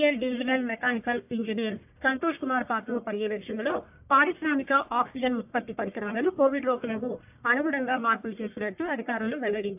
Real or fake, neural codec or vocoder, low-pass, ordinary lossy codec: fake; codec, 44.1 kHz, 1.7 kbps, Pupu-Codec; 3.6 kHz; AAC, 32 kbps